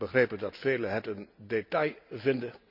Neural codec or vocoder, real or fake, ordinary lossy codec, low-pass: none; real; none; 5.4 kHz